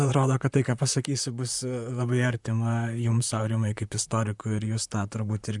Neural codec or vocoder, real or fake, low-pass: vocoder, 44.1 kHz, 128 mel bands, Pupu-Vocoder; fake; 10.8 kHz